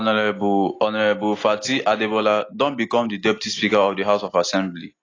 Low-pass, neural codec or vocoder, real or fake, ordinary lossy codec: 7.2 kHz; none; real; AAC, 32 kbps